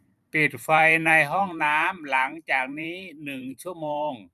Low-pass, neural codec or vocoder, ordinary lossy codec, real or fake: 14.4 kHz; vocoder, 44.1 kHz, 128 mel bands every 512 samples, BigVGAN v2; none; fake